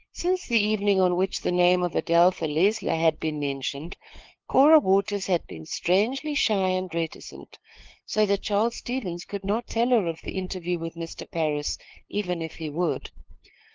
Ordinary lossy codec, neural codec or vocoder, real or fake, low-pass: Opus, 16 kbps; codec, 16 kHz, 2 kbps, FunCodec, trained on LibriTTS, 25 frames a second; fake; 7.2 kHz